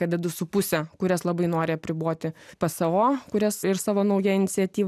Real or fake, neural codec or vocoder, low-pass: real; none; 14.4 kHz